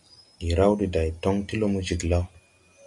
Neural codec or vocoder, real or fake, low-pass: none; real; 10.8 kHz